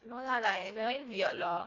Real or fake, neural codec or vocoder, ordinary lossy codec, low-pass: fake; codec, 24 kHz, 1.5 kbps, HILCodec; AAC, 32 kbps; 7.2 kHz